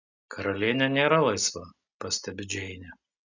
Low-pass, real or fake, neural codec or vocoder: 7.2 kHz; real; none